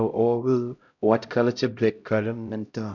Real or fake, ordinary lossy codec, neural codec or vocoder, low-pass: fake; none; codec, 16 kHz, 0.5 kbps, X-Codec, HuBERT features, trained on LibriSpeech; 7.2 kHz